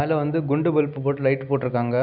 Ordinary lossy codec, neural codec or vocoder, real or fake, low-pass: none; none; real; 5.4 kHz